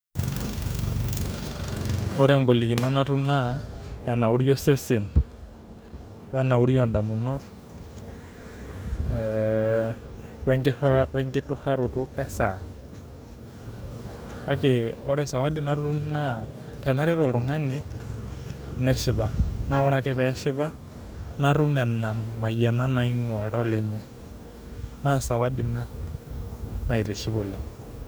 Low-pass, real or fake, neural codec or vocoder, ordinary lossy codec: none; fake; codec, 44.1 kHz, 2.6 kbps, DAC; none